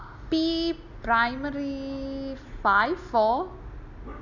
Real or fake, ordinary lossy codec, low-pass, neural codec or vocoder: real; none; 7.2 kHz; none